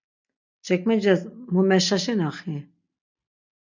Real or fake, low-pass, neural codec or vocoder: real; 7.2 kHz; none